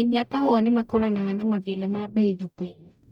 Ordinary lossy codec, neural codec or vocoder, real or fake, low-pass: none; codec, 44.1 kHz, 0.9 kbps, DAC; fake; 19.8 kHz